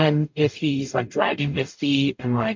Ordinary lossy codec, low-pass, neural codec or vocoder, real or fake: MP3, 48 kbps; 7.2 kHz; codec, 44.1 kHz, 0.9 kbps, DAC; fake